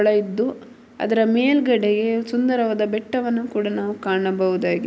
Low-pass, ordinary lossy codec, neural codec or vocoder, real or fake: none; none; none; real